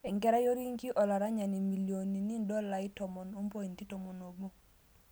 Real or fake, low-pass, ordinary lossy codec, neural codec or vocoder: real; none; none; none